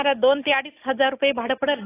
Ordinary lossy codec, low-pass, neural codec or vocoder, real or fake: AAC, 16 kbps; 3.6 kHz; none; real